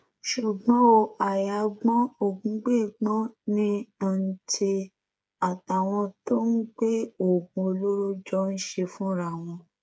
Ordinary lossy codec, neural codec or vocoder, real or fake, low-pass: none; codec, 16 kHz, 8 kbps, FreqCodec, smaller model; fake; none